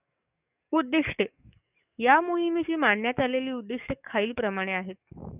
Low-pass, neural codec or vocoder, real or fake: 3.6 kHz; codec, 44.1 kHz, 7.8 kbps, DAC; fake